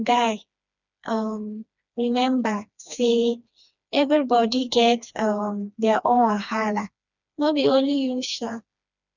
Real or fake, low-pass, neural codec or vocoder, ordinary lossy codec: fake; 7.2 kHz; codec, 16 kHz, 2 kbps, FreqCodec, smaller model; none